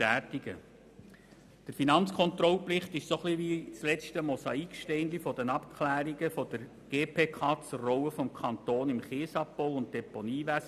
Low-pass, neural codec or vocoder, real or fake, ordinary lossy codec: 14.4 kHz; none; real; none